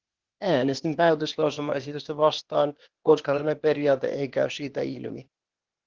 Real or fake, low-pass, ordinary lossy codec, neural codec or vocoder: fake; 7.2 kHz; Opus, 16 kbps; codec, 16 kHz, 0.8 kbps, ZipCodec